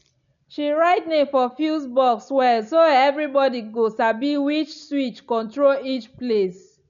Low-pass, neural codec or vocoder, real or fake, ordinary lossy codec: 7.2 kHz; none; real; none